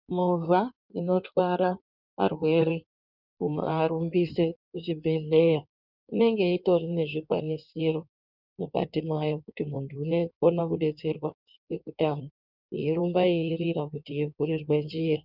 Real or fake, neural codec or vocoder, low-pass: fake; codec, 16 kHz in and 24 kHz out, 1.1 kbps, FireRedTTS-2 codec; 5.4 kHz